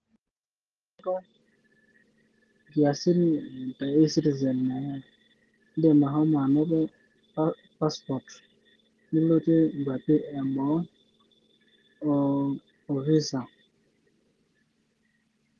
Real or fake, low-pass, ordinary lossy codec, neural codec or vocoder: real; 10.8 kHz; Opus, 16 kbps; none